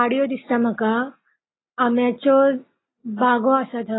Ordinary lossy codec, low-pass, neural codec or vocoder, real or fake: AAC, 16 kbps; 7.2 kHz; none; real